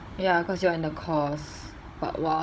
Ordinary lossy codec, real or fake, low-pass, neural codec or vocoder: none; fake; none; codec, 16 kHz, 16 kbps, FunCodec, trained on Chinese and English, 50 frames a second